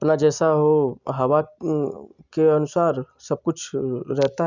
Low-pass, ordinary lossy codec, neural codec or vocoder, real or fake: 7.2 kHz; none; none; real